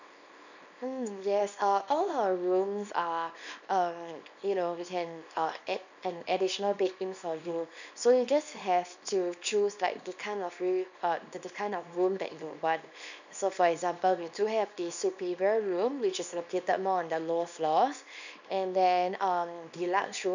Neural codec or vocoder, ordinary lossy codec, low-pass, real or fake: codec, 24 kHz, 0.9 kbps, WavTokenizer, small release; none; 7.2 kHz; fake